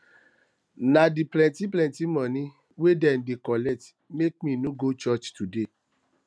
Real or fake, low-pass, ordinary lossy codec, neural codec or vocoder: real; none; none; none